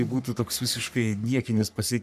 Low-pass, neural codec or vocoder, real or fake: 14.4 kHz; codec, 44.1 kHz, 3.4 kbps, Pupu-Codec; fake